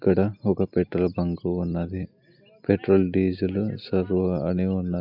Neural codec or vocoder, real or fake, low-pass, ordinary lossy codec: none; real; 5.4 kHz; none